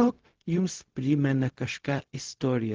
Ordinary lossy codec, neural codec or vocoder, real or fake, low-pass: Opus, 16 kbps; codec, 16 kHz, 0.4 kbps, LongCat-Audio-Codec; fake; 7.2 kHz